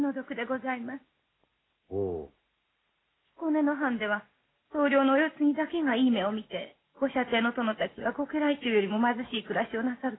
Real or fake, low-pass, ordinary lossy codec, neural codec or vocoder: real; 7.2 kHz; AAC, 16 kbps; none